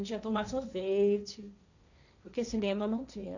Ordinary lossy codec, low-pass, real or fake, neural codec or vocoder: none; 7.2 kHz; fake; codec, 16 kHz, 1.1 kbps, Voila-Tokenizer